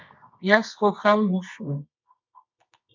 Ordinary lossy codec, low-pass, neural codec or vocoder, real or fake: MP3, 64 kbps; 7.2 kHz; codec, 24 kHz, 0.9 kbps, WavTokenizer, medium music audio release; fake